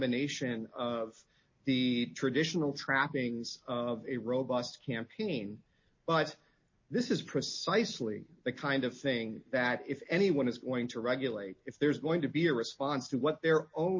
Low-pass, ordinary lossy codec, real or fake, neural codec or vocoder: 7.2 kHz; MP3, 32 kbps; real; none